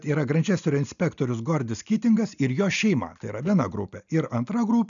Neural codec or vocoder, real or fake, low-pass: none; real; 7.2 kHz